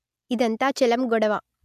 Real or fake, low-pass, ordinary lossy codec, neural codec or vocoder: real; 14.4 kHz; none; none